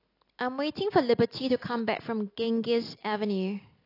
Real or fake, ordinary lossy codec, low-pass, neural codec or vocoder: real; AAC, 32 kbps; 5.4 kHz; none